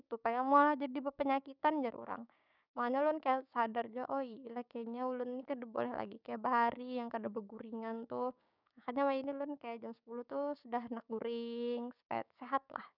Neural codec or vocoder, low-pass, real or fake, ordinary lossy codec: codec, 16 kHz, 6 kbps, DAC; 5.4 kHz; fake; none